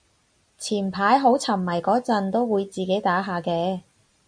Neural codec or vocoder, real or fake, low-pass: none; real; 9.9 kHz